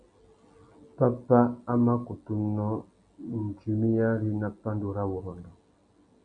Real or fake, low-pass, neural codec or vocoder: real; 9.9 kHz; none